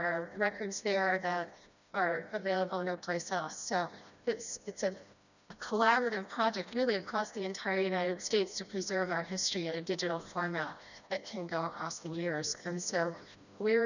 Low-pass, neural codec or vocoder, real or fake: 7.2 kHz; codec, 16 kHz, 1 kbps, FreqCodec, smaller model; fake